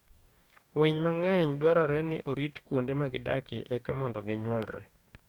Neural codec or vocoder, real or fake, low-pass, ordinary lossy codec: codec, 44.1 kHz, 2.6 kbps, DAC; fake; 19.8 kHz; none